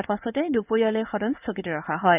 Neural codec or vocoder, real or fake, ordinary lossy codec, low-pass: codec, 16 kHz in and 24 kHz out, 1 kbps, XY-Tokenizer; fake; none; 3.6 kHz